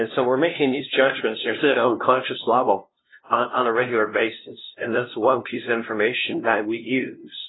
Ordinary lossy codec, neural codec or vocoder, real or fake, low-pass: AAC, 16 kbps; codec, 16 kHz, 0.5 kbps, FunCodec, trained on LibriTTS, 25 frames a second; fake; 7.2 kHz